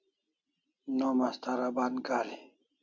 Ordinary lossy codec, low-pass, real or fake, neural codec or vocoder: Opus, 64 kbps; 7.2 kHz; real; none